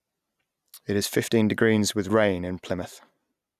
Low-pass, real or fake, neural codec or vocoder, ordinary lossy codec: 14.4 kHz; real; none; none